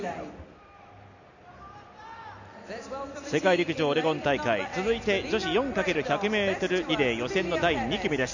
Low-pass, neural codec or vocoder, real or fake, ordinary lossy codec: 7.2 kHz; none; real; none